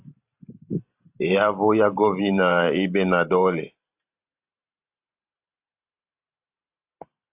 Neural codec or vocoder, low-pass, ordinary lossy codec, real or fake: vocoder, 44.1 kHz, 128 mel bands every 512 samples, BigVGAN v2; 3.6 kHz; AAC, 32 kbps; fake